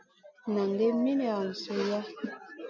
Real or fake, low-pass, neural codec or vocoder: real; 7.2 kHz; none